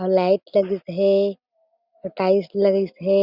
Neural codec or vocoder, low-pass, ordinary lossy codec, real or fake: none; 5.4 kHz; Opus, 64 kbps; real